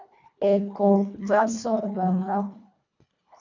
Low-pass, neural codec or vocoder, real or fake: 7.2 kHz; codec, 24 kHz, 1.5 kbps, HILCodec; fake